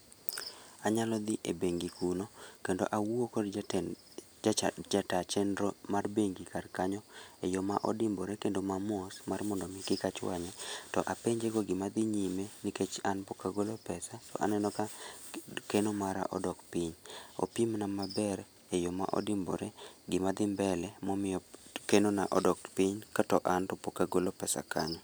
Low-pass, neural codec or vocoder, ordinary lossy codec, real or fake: none; none; none; real